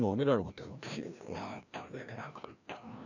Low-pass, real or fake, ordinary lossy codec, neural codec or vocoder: 7.2 kHz; fake; none; codec, 16 kHz, 1 kbps, FreqCodec, larger model